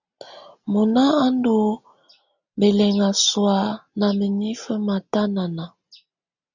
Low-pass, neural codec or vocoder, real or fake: 7.2 kHz; none; real